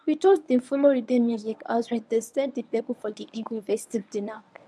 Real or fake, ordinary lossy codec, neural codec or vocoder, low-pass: fake; none; codec, 24 kHz, 0.9 kbps, WavTokenizer, medium speech release version 1; none